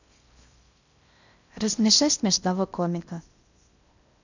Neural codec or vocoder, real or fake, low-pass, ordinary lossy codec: codec, 16 kHz in and 24 kHz out, 0.6 kbps, FocalCodec, streaming, 4096 codes; fake; 7.2 kHz; none